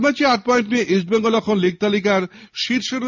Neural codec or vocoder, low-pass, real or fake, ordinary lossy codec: none; 7.2 kHz; real; MP3, 64 kbps